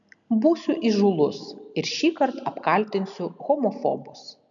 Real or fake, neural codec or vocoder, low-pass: real; none; 7.2 kHz